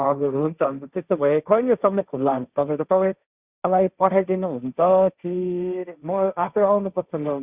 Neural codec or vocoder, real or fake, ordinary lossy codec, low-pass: codec, 16 kHz, 1.1 kbps, Voila-Tokenizer; fake; Opus, 64 kbps; 3.6 kHz